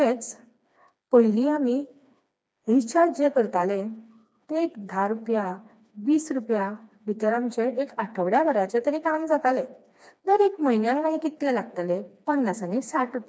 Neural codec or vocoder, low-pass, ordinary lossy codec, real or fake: codec, 16 kHz, 2 kbps, FreqCodec, smaller model; none; none; fake